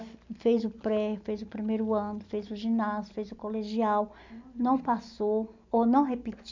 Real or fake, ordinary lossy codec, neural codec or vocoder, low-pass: real; MP3, 64 kbps; none; 7.2 kHz